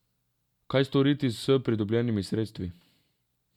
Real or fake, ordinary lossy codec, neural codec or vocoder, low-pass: real; none; none; 19.8 kHz